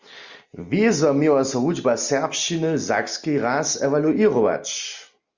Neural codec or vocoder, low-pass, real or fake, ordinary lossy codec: none; 7.2 kHz; real; Opus, 64 kbps